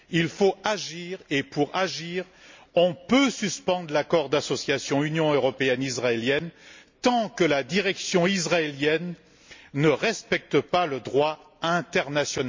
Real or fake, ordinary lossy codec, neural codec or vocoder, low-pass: real; MP3, 64 kbps; none; 7.2 kHz